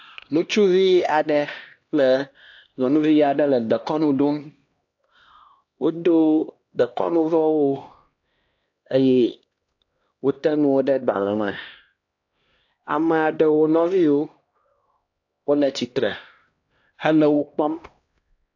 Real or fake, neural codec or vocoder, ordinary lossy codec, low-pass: fake; codec, 16 kHz, 1 kbps, X-Codec, HuBERT features, trained on LibriSpeech; AAC, 48 kbps; 7.2 kHz